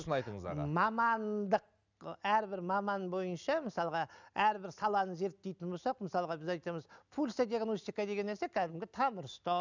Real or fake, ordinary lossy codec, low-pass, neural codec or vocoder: real; none; 7.2 kHz; none